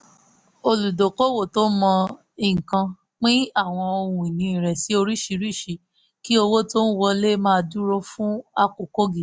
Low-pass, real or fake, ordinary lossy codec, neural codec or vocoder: none; real; none; none